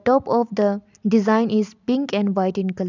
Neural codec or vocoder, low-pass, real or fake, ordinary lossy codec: none; 7.2 kHz; real; none